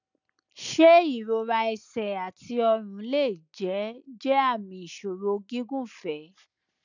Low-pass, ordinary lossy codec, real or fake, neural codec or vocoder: 7.2 kHz; none; real; none